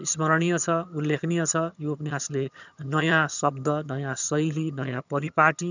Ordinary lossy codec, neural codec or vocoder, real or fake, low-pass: none; vocoder, 22.05 kHz, 80 mel bands, HiFi-GAN; fake; 7.2 kHz